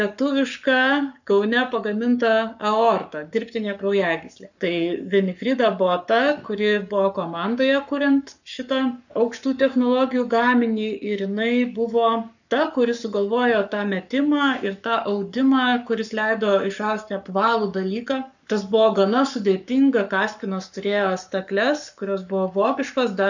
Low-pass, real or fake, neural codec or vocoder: 7.2 kHz; fake; codec, 44.1 kHz, 7.8 kbps, Pupu-Codec